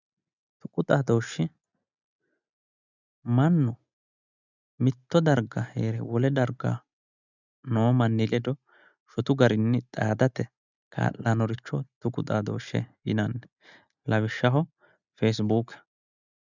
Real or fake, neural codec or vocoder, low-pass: real; none; 7.2 kHz